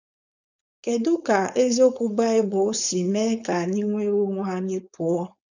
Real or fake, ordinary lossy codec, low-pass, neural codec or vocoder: fake; none; 7.2 kHz; codec, 16 kHz, 4.8 kbps, FACodec